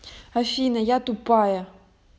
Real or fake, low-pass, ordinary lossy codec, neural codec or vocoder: real; none; none; none